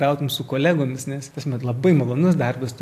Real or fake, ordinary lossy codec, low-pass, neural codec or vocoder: real; AAC, 64 kbps; 14.4 kHz; none